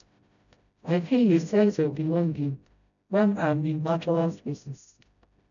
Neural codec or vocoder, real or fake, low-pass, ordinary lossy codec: codec, 16 kHz, 0.5 kbps, FreqCodec, smaller model; fake; 7.2 kHz; none